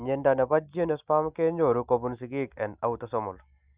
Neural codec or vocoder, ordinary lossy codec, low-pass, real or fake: none; Opus, 64 kbps; 3.6 kHz; real